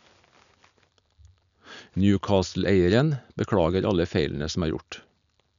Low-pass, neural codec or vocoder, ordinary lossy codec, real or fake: 7.2 kHz; none; none; real